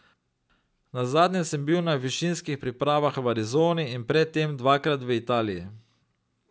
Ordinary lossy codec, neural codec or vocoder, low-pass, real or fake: none; none; none; real